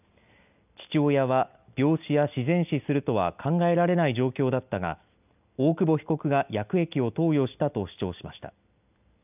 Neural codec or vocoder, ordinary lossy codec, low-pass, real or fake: none; none; 3.6 kHz; real